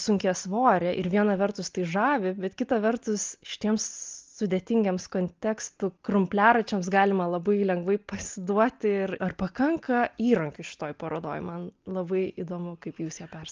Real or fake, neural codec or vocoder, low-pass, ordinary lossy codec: real; none; 7.2 kHz; Opus, 24 kbps